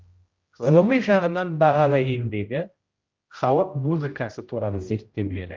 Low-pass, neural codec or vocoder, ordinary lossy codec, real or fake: 7.2 kHz; codec, 16 kHz, 0.5 kbps, X-Codec, HuBERT features, trained on general audio; Opus, 24 kbps; fake